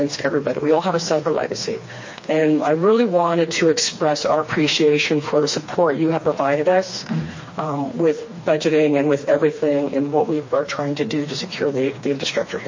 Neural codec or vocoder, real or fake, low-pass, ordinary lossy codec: codec, 16 kHz, 2 kbps, FreqCodec, smaller model; fake; 7.2 kHz; MP3, 32 kbps